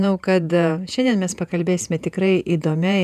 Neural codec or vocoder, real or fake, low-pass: vocoder, 48 kHz, 128 mel bands, Vocos; fake; 14.4 kHz